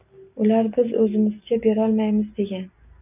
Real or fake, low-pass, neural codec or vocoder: real; 3.6 kHz; none